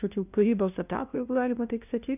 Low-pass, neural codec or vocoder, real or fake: 3.6 kHz; codec, 16 kHz, 0.5 kbps, FunCodec, trained on LibriTTS, 25 frames a second; fake